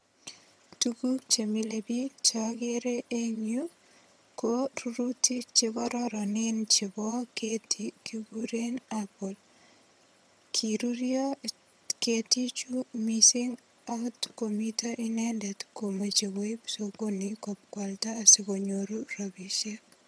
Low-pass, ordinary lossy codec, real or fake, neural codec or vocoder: none; none; fake; vocoder, 22.05 kHz, 80 mel bands, HiFi-GAN